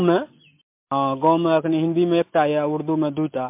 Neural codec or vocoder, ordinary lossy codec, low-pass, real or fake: none; MP3, 32 kbps; 3.6 kHz; real